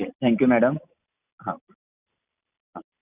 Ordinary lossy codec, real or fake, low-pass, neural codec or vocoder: AAC, 32 kbps; real; 3.6 kHz; none